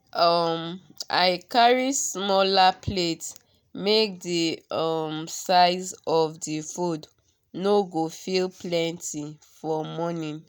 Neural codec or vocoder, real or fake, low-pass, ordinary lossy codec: none; real; none; none